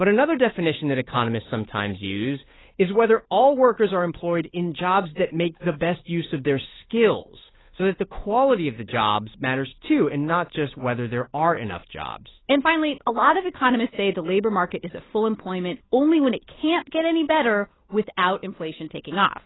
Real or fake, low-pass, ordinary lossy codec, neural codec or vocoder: fake; 7.2 kHz; AAC, 16 kbps; codec, 16 kHz, 8 kbps, FunCodec, trained on Chinese and English, 25 frames a second